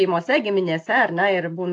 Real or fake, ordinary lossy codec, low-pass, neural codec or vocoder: real; AAC, 48 kbps; 10.8 kHz; none